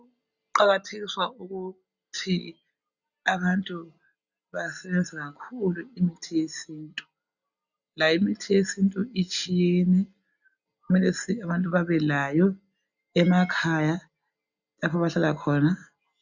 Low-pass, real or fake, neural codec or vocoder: 7.2 kHz; real; none